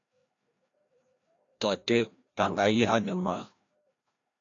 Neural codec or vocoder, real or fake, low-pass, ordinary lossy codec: codec, 16 kHz, 1 kbps, FreqCodec, larger model; fake; 7.2 kHz; AAC, 48 kbps